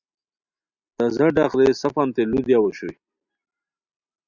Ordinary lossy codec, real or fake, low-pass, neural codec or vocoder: Opus, 64 kbps; real; 7.2 kHz; none